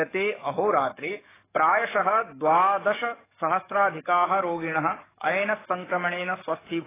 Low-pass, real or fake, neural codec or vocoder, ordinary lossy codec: 3.6 kHz; fake; codec, 44.1 kHz, 7.8 kbps, DAC; AAC, 16 kbps